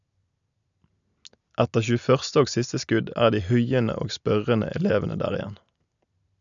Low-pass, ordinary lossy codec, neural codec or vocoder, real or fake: 7.2 kHz; none; none; real